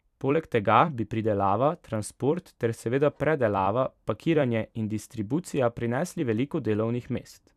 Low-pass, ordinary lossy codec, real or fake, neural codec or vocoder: 14.4 kHz; none; fake; vocoder, 44.1 kHz, 128 mel bands every 256 samples, BigVGAN v2